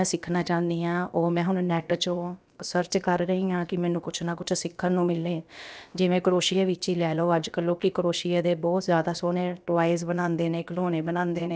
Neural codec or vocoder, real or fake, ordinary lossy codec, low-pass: codec, 16 kHz, about 1 kbps, DyCAST, with the encoder's durations; fake; none; none